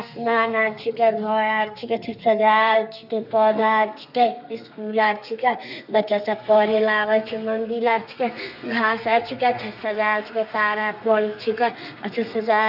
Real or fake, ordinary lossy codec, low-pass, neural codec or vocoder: fake; none; 5.4 kHz; codec, 32 kHz, 1.9 kbps, SNAC